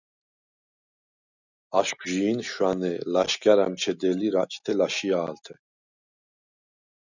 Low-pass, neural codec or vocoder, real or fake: 7.2 kHz; none; real